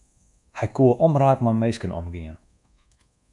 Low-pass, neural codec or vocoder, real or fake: 10.8 kHz; codec, 24 kHz, 1.2 kbps, DualCodec; fake